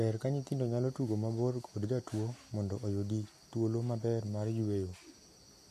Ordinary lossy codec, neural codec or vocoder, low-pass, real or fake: MP3, 64 kbps; none; 14.4 kHz; real